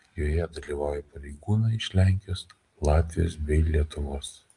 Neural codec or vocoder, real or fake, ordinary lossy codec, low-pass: none; real; Opus, 32 kbps; 10.8 kHz